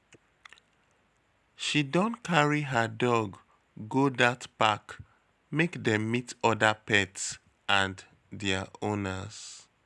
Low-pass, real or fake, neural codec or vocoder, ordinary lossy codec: none; real; none; none